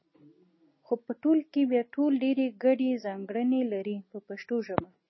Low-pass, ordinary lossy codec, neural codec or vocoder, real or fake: 7.2 kHz; MP3, 24 kbps; none; real